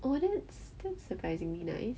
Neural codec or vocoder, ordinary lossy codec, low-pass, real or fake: none; none; none; real